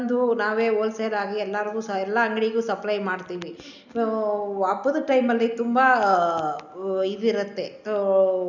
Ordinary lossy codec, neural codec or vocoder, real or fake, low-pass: none; none; real; 7.2 kHz